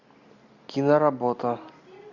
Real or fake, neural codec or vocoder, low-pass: real; none; 7.2 kHz